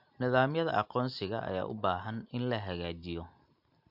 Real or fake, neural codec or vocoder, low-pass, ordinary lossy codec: real; none; 5.4 kHz; MP3, 48 kbps